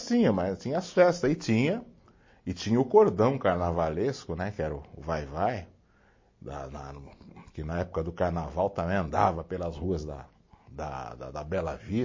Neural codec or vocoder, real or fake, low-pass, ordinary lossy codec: none; real; 7.2 kHz; MP3, 32 kbps